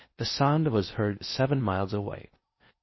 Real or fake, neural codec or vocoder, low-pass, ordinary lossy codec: fake; codec, 16 kHz in and 24 kHz out, 0.6 kbps, FocalCodec, streaming, 4096 codes; 7.2 kHz; MP3, 24 kbps